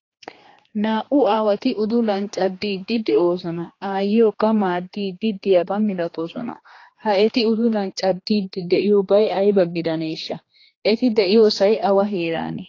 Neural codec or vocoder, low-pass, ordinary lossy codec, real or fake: codec, 16 kHz, 2 kbps, X-Codec, HuBERT features, trained on general audio; 7.2 kHz; AAC, 32 kbps; fake